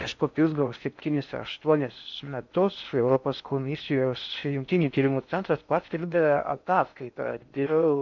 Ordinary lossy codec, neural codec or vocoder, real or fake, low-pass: MP3, 64 kbps; codec, 16 kHz in and 24 kHz out, 0.6 kbps, FocalCodec, streaming, 4096 codes; fake; 7.2 kHz